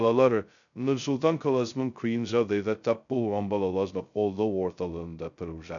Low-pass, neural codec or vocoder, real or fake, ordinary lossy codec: 7.2 kHz; codec, 16 kHz, 0.2 kbps, FocalCodec; fake; none